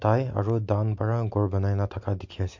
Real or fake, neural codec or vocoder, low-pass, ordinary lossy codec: real; none; 7.2 kHz; MP3, 48 kbps